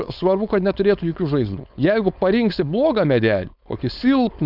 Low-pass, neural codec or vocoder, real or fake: 5.4 kHz; codec, 16 kHz, 4.8 kbps, FACodec; fake